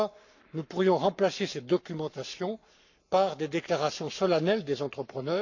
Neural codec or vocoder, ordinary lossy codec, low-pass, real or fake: codec, 44.1 kHz, 7.8 kbps, Pupu-Codec; none; 7.2 kHz; fake